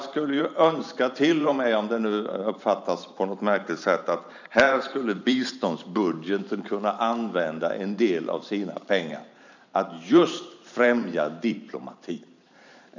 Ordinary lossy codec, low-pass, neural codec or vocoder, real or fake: none; 7.2 kHz; vocoder, 44.1 kHz, 128 mel bands every 512 samples, BigVGAN v2; fake